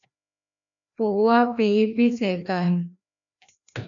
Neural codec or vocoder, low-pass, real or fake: codec, 16 kHz, 1 kbps, FreqCodec, larger model; 7.2 kHz; fake